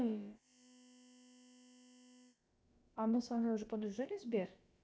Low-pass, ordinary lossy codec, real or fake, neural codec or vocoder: none; none; fake; codec, 16 kHz, about 1 kbps, DyCAST, with the encoder's durations